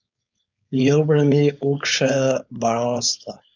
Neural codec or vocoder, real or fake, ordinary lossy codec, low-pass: codec, 16 kHz, 4.8 kbps, FACodec; fake; MP3, 64 kbps; 7.2 kHz